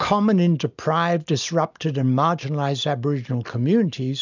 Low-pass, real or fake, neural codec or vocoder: 7.2 kHz; real; none